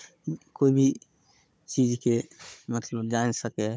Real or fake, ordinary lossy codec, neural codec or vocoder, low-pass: fake; none; codec, 16 kHz, 16 kbps, FunCodec, trained on Chinese and English, 50 frames a second; none